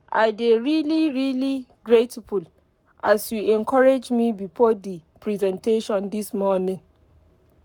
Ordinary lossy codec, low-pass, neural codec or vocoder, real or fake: none; 19.8 kHz; codec, 44.1 kHz, 7.8 kbps, Pupu-Codec; fake